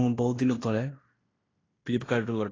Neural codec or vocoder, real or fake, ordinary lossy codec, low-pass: codec, 16 kHz in and 24 kHz out, 0.9 kbps, LongCat-Audio-Codec, fine tuned four codebook decoder; fake; AAC, 32 kbps; 7.2 kHz